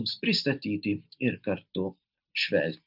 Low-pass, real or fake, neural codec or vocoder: 5.4 kHz; real; none